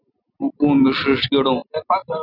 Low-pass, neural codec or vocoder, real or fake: 5.4 kHz; none; real